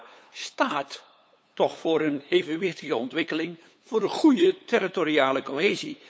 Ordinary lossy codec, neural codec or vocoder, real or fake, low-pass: none; codec, 16 kHz, 8 kbps, FunCodec, trained on LibriTTS, 25 frames a second; fake; none